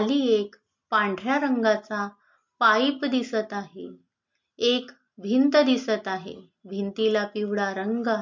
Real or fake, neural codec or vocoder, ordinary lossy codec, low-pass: real; none; MP3, 48 kbps; 7.2 kHz